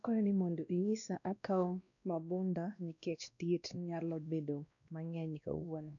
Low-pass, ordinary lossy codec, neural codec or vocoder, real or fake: 7.2 kHz; none; codec, 16 kHz, 1 kbps, X-Codec, WavLM features, trained on Multilingual LibriSpeech; fake